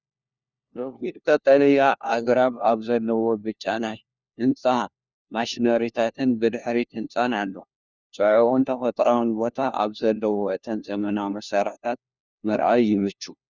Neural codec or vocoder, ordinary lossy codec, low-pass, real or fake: codec, 16 kHz, 1 kbps, FunCodec, trained on LibriTTS, 50 frames a second; Opus, 64 kbps; 7.2 kHz; fake